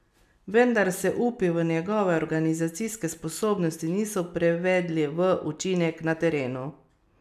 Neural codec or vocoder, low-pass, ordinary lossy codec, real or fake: none; 14.4 kHz; none; real